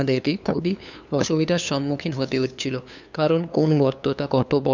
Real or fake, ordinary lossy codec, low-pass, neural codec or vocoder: fake; none; 7.2 kHz; codec, 16 kHz, 2 kbps, FunCodec, trained on LibriTTS, 25 frames a second